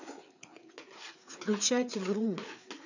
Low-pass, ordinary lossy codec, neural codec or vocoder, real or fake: 7.2 kHz; none; codec, 16 kHz, 4 kbps, FreqCodec, larger model; fake